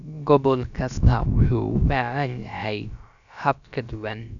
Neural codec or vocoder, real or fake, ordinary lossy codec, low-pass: codec, 16 kHz, about 1 kbps, DyCAST, with the encoder's durations; fake; none; 7.2 kHz